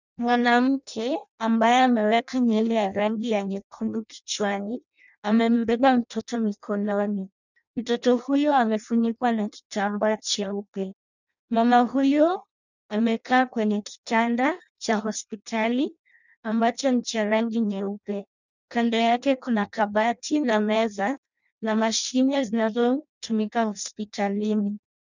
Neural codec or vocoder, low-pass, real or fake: codec, 16 kHz in and 24 kHz out, 0.6 kbps, FireRedTTS-2 codec; 7.2 kHz; fake